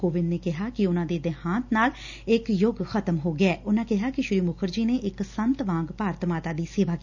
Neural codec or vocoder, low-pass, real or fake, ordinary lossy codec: none; 7.2 kHz; real; none